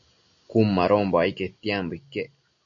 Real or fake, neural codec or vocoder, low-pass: real; none; 7.2 kHz